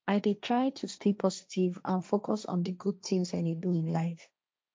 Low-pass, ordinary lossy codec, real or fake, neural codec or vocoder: none; none; fake; codec, 16 kHz, 1.1 kbps, Voila-Tokenizer